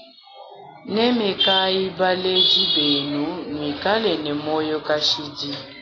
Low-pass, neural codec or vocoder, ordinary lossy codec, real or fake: 7.2 kHz; none; AAC, 32 kbps; real